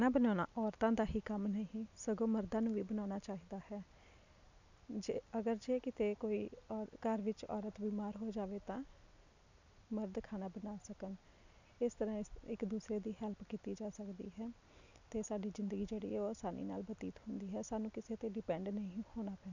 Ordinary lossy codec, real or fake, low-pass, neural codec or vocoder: none; real; 7.2 kHz; none